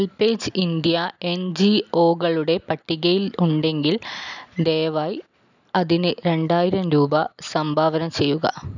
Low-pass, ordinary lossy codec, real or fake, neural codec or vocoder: 7.2 kHz; none; real; none